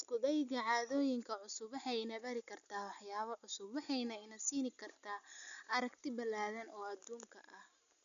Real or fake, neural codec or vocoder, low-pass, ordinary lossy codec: real; none; 7.2 kHz; none